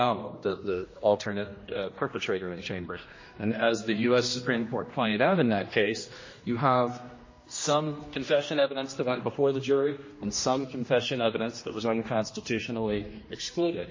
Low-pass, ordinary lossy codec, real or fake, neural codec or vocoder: 7.2 kHz; MP3, 32 kbps; fake; codec, 16 kHz, 1 kbps, X-Codec, HuBERT features, trained on general audio